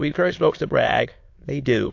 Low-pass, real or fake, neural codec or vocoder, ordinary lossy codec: 7.2 kHz; fake; autoencoder, 22.05 kHz, a latent of 192 numbers a frame, VITS, trained on many speakers; AAC, 48 kbps